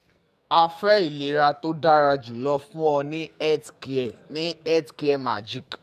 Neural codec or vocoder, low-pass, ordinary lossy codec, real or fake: codec, 44.1 kHz, 2.6 kbps, SNAC; 14.4 kHz; none; fake